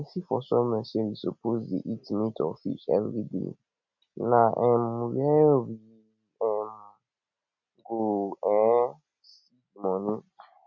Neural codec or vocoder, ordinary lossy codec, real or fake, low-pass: none; none; real; 7.2 kHz